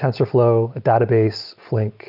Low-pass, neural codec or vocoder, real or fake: 5.4 kHz; none; real